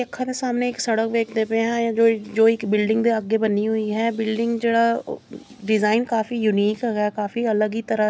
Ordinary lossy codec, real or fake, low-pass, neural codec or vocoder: none; real; none; none